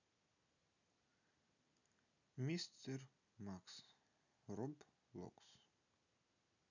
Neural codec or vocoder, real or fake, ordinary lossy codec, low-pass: none; real; none; 7.2 kHz